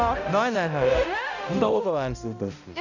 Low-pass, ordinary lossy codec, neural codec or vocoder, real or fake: 7.2 kHz; none; codec, 16 kHz, 0.5 kbps, X-Codec, HuBERT features, trained on balanced general audio; fake